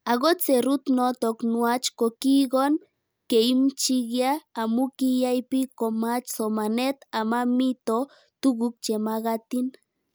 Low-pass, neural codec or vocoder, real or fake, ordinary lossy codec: none; none; real; none